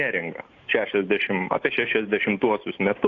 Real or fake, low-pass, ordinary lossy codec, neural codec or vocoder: real; 7.2 kHz; AAC, 48 kbps; none